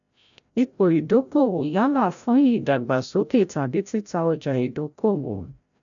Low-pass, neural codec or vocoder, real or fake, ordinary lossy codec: 7.2 kHz; codec, 16 kHz, 0.5 kbps, FreqCodec, larger model; fake; none